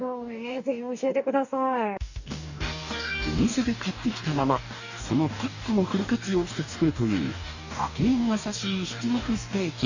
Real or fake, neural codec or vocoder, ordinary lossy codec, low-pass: fake; codec, 44.1 kHz, 2.6 kbps, DAC; none; 7.2 kHz